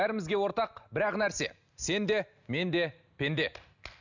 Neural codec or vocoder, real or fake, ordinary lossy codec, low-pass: none; real; none; 7.2 kHz